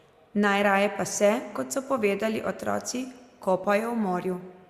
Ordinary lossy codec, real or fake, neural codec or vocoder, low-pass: Opus, 64 kbps; real; none; 14.4 kHz